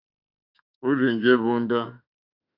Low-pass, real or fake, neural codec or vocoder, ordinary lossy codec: 5.4 kHz; fake; autoencoder, 48 kHz, 32 numbers a frame, DAC-VAE, trained on Japanese speech; AAC, 48 kbps